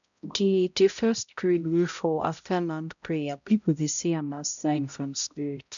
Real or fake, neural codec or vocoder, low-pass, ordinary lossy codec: fake; codec, 16 kHz, 0.5 kbps, X-Codec, HuBERT features, trained on balanced general audio; 7.2 kHz; none